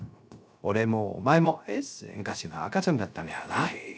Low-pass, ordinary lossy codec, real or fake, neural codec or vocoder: none; none; fake; codec, 16 kHz, 0.3 kbps, FocalCodec